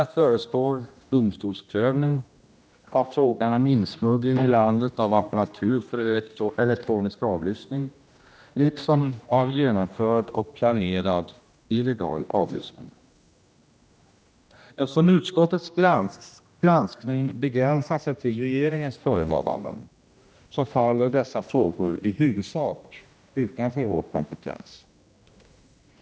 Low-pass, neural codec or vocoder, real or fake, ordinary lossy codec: none; codec, 16 kHz, 1 kbps, X-Codec, HuBERT features, trained on general audio; fake; none